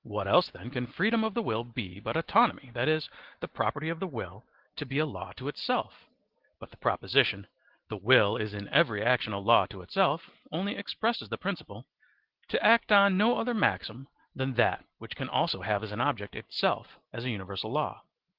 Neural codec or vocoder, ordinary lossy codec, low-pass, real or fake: none; Opus, 16 kbps; 5.4 kHz; real